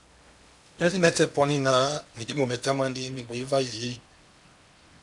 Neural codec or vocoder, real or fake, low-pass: codec, 16 kHz in and 24 kHz out, 0.8 kbps, FocalCodec, streaming, 65536 codes; fake; 10.8 kHz